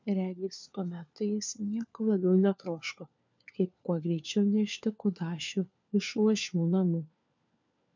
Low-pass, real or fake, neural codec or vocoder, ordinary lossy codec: 7.2 kHz; fake; codec, 16 kHz, 4 kbps, FunCodec, trained on LibriTTS, 50 frames a second; MP3, 64 kbps